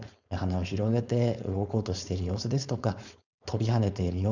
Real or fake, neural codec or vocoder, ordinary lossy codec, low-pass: fake; codec, 16 kHz, 4.8 kbps, FACodec; none; 7.2 kHz